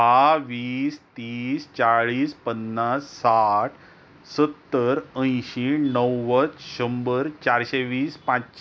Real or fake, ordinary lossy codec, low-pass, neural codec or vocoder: real; none; none; none